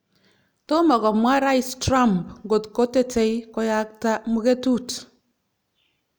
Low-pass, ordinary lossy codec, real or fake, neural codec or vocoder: none; none; real; none